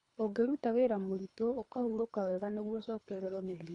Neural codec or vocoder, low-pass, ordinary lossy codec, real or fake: codec, 24 kHz, 3 kbps, HILCodec; 10.8 kHz; none; fake